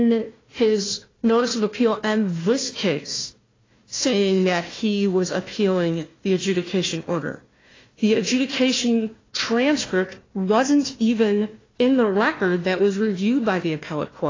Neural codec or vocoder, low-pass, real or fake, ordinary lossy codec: codec, 16 kHz, 1 kbps, FunCodec, trained on Chinese and English, 50 frames a second; 7.2 kHz; fake; AAC, 32 kbps